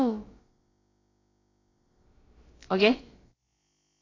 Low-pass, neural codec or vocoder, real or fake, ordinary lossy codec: 7.2 kHz; codec, 16 kHz, about 1 kbps, DyCAST, with the encoder's durations; fake; AAC, 32 kbps